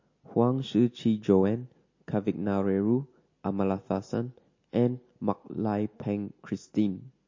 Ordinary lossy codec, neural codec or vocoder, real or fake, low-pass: MP3, 32 kbps; none; real; 7.2 kHz